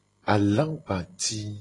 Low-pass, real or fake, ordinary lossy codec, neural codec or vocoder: 10.8 kHz; real; AAC, 32 kbps; none